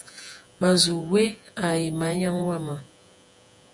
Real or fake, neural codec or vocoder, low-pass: fake; vocoder, 48 kHz, 128 mel bands, Vocos; 10.8 kHz